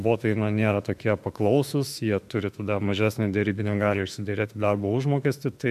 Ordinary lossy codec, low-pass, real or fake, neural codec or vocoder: MP3, 96 kbps; 14.4 kHz; fake; autoencoder, 48 kHz, 32 numbers a frame, DAC-VAE, trained on Japanese speech